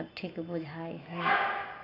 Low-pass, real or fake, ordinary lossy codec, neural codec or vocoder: 5.4 kHz; real; AAC, 24 kbps; none